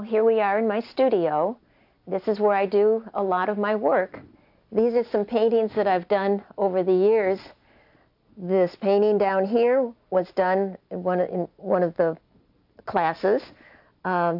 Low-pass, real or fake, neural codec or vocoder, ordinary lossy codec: 5.4 kHz; real; none; AAC, 48 kbps